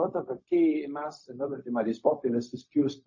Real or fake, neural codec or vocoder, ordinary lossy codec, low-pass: fake; codec, 16 kHz, 0.4 kbps, LongCat-Audio-Codec; MP3, 32 kbps; 7.2 kHz